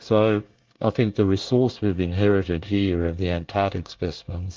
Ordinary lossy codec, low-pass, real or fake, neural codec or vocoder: Opus, 32 kbps; 7.2 kHz; fake; codec, 24 kHz, 1 kbps, SNAC